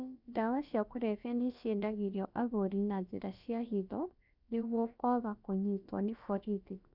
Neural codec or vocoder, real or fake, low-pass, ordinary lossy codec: codec, 16 kHz, about 1 kbps, DyCAST, with the encoder's durations; fake; 5.4 kHz; none